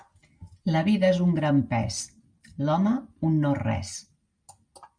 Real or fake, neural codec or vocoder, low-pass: real; none; 9.9 kHz